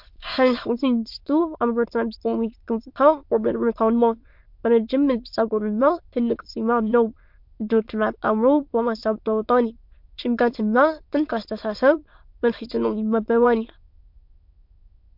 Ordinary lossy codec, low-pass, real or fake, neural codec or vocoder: MP3, 48 kbps; 5.4 kHz; fake; autoencoder, 22.05 kHz, a latent of 192 numbers a frame, VITS, trained on many speakers